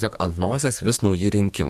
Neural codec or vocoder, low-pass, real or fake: codec, 44.1 kHz, 2.6 kbps, SNAC; 14.4 kHz; fake